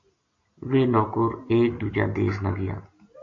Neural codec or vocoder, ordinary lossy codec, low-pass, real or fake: none; AAC, 48 kbps; 7.2 kHz; real